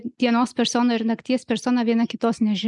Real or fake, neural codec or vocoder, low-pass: real; none; 10.8 kHz